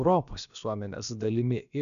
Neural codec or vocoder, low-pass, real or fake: codec, 16 kHz, about 1 kbps, DyCAST, with the encoder's durations; 7.2 kHz; fake